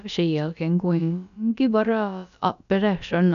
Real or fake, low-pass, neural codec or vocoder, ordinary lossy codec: fake; 7.2 kHz; codec, 16 kHz, about 1 kbps, DyCAST, with the encoder's durations; none